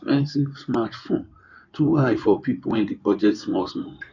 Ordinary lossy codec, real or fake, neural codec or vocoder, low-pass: none; fake; codec, 16 kHz in and 24 kHz out, 2.2 kbps, FireRedTTS-2 codec; 7.2 kHz